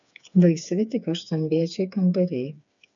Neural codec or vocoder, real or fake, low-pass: codec, 16 kHz, 4 kbps, FreqCodec, smaller model; fake; 7.2 kHz